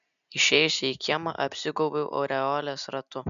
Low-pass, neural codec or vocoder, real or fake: 7.2 kHz; none; real